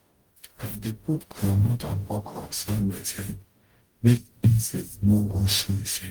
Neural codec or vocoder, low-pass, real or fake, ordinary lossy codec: codec, 44.1 kHz, 0.9 kbps, DAC; 19.8 kHz; fake; Opus, 32 kbps